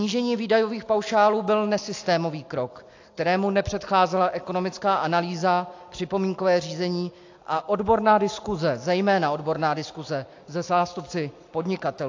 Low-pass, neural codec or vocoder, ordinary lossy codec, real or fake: 7.2 kHz; none; AAC, 48 kbps; real